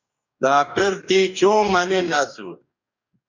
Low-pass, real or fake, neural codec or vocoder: 7.2 kHz; fake; codec, 44.1 kHz, 2.6 kbps, DAC